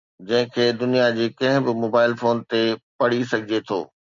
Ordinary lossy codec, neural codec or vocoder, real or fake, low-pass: MP3, 64 kbps; none; real; 7.2 kHz